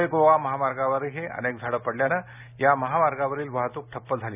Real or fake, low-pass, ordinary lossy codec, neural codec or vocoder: real; 3.6 kHz; none; none